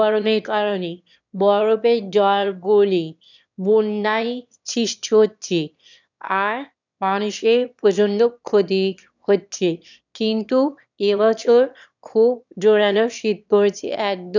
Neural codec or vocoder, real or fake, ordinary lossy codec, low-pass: autoencoder, 22.05 kHz, a latent of 192 numbers a frame, VITS, trained on one speaker; fake; none; 7.2 kHz